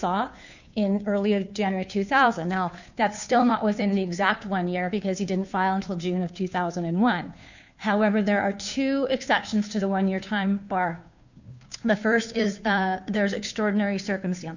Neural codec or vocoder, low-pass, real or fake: codec, 16 kHz, 2 kbps, FunCodec, trained on Chinese and English, 25 frames a second; 7.2 kHz; fake